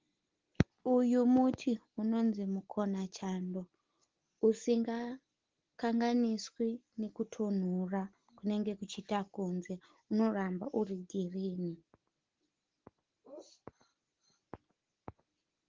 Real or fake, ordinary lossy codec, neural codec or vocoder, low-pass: real; Opus, 16 kbps; none; 7.2 kHz